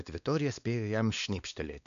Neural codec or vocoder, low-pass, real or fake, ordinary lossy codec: codec, 16 kHz, 2 kbps, X-Codec, WavLM features, trained on Multilingual LibriSpeech; 7.2 kHz; fake; AAC, 96 kbps